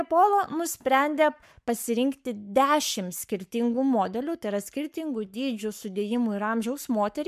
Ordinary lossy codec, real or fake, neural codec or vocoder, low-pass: MP3, 96 kbps; fake; codec, 44.1 kHz, 7.8 kbps, Pupu-Codec; 14.4 kHz